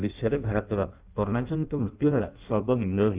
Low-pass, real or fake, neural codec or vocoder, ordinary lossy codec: 3.6 kHz; fake; codec, 16 kHz in and 24 kHz out, 0.6 kbps, FireRedTTS-2 codec; Opus, 32 kbps